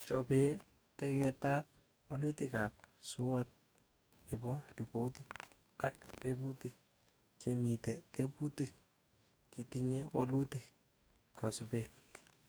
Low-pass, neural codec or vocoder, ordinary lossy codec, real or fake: none; codec, 44.1 kHz, 2.6 kbps, DAC; none; fake